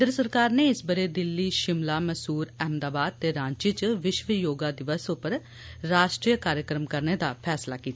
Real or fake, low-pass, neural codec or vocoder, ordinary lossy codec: real; none; none; none